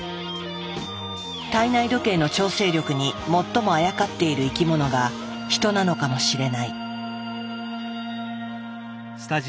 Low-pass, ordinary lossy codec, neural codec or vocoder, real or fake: none; none; none; real